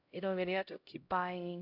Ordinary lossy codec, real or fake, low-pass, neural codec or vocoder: AAC, 32 kbps; fake; 5.4 kHz; codec, 16 kHz, 0.5 kbps, X-Codec, HuBERT features, trained on LibriSpeech